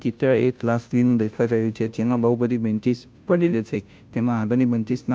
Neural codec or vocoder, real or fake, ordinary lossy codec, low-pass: codec, 16 kHz, 0.5 kbps, FunCodec, trained on Chinese and English, 25 frames a second; fake; none; none